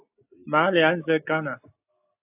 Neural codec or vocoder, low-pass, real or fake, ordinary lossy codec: none; 3.6 kHz; real; AAC, 24 kbps